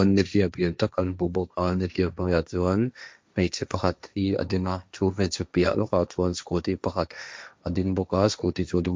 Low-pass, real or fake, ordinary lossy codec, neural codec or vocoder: none; fake; none; codec, 16 kHz, 1.1 kbps, Voila-Tokenizer